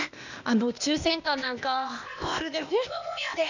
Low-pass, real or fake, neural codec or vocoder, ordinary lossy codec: 7.2 kHz; fake; codec, 16 kHz, 0.8 kbps, ZipCodec; none